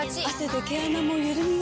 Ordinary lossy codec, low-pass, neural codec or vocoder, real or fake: none; none; none; real